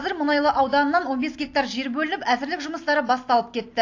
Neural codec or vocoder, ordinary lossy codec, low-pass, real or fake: none; AAC, 48 kbps; 7.2 kHz; real